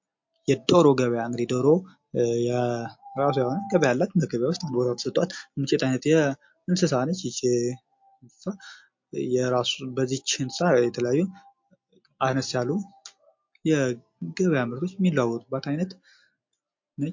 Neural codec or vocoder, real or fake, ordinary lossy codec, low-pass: none; real; MP3, 48 kbps; 7.2 kHz